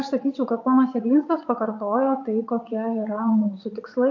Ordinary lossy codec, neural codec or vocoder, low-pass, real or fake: AAC, 48 kbps; vocoder, 22.05 kHz, 80 mel bands, WaveNeXt; 7.2 kHz; fake